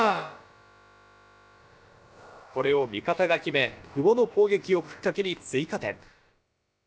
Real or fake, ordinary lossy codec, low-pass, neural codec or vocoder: fake; none; none; codec, 16 kHz, about 1 kbps, DyCAST, with the encoder's durations